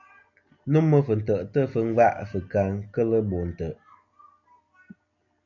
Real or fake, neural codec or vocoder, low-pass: real; none; 7.2 kHz